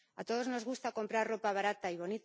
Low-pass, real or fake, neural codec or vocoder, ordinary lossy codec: none; real; none; none